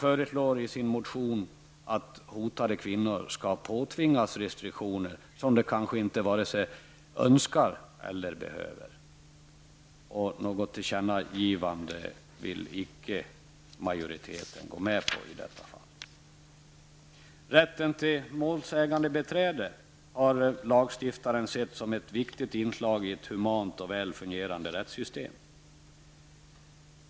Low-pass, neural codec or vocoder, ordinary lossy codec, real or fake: none; none; none; real